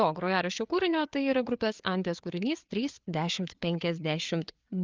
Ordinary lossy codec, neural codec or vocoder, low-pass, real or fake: Opus, 32 kbps; codec, 16 kHz, 4 kbps, FreqCodec, larger model; 7.2 kHz; fake